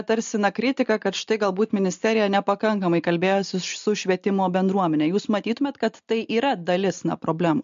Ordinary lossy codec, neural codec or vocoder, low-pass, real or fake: MP3, 48 kbps; none; 7.2 kHz; real